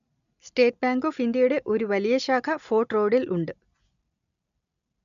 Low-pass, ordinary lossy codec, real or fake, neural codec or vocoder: 7.2 kHz; none; real; none